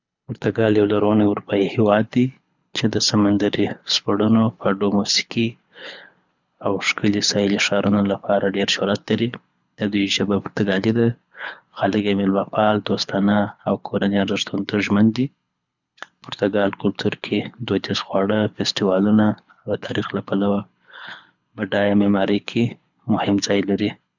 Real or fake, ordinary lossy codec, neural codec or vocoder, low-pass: fake; none; codec, 24 kHz, 6 kbps, HILCodec; 7.2 kHz